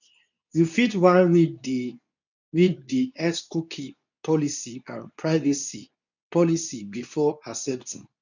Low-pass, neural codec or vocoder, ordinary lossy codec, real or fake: 7.2 kHz; codec, 24 kHz, 0.9 kbps, WavTokenizer, medium speech release version 2; none; fake